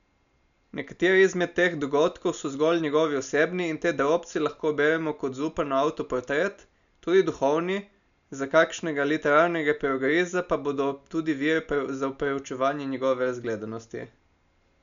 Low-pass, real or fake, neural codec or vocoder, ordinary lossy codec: 7.2 kHz; real; none; none